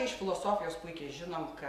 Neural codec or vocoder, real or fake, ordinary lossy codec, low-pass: none; real; AAC, 64 kbps; 14.4 kHz